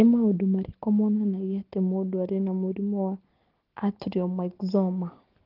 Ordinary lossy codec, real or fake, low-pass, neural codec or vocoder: Opus, 16 kbps; real; 5.4 kHz; none